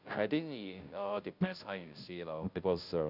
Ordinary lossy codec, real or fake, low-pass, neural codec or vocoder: none; fake; 5.4 kHz; codec, 16 kHz, 0.5 kbps, FunCodec, trained on Chinese and English, 25 frames a second